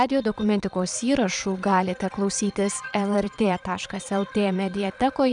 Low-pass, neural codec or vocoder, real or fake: 9.9 kHz; vocoder, 22.05 kHz, 80 mel bands, Vocos; fake